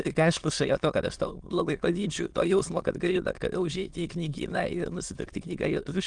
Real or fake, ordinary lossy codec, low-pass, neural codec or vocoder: fake; Opus, 24 kbps; 9.9 kHz; autoencoder, 22.05 kHz, a latent of 192 numbers a frame, VITS, trained on many speakers